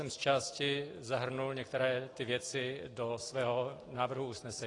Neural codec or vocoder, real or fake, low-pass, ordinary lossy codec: none; real; 10.8 kHz; AAC, 32 kbps